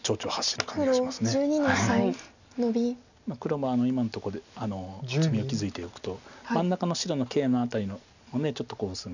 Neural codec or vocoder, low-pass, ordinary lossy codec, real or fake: none; 7.2 kHz; none; real